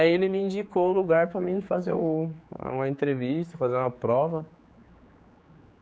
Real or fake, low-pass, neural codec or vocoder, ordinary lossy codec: fake; none; codec, 16 kHz, 4 kbps, X-Codec, HuBERT features, trained on general audio; none